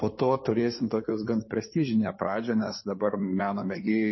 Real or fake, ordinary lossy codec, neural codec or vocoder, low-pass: fake; MP3, 24 kbps; codec, 16 kHz in and 24 kHz out, 2.2 kbps, FireRedTTS-2 codec; 7.2 kHz